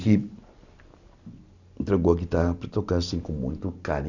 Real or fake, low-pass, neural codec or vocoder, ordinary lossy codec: real; 7.2 kHz; none; none